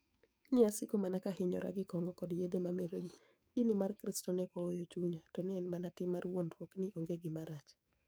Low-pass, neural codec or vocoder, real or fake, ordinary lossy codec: none; codec, 44.1 kHz, 7.8 kbps, DAC; fake; none